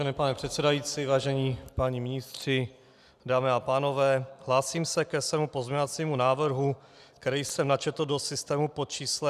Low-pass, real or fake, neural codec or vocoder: 14.4 kHz; real; none